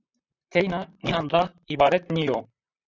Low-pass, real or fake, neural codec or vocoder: 7.2 kHz; fake; vocoder, 44.1 kHz, 128 mel bands, Pupu-Vocoder